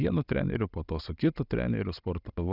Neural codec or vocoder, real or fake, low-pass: none; real; 5.4 kHz